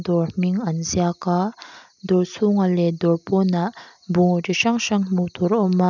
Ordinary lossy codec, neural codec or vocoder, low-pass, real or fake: none; none; 7.2 kHz; real